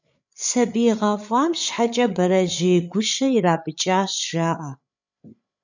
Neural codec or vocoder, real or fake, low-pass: codec, 16 kHz, 8 kbps, FreqCodec, larger model; fake; 7.2 kHz